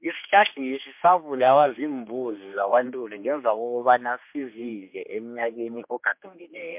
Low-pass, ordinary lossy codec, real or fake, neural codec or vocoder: 3.6 kHz; MP3, 32 kbps; fake; codec, 16 kHz, 2 kbps, X-Codec, HuBERT features, trained on general audio